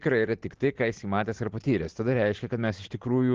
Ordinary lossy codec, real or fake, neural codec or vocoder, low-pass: Opus, 16 kbps; real; none; 7.2 kHz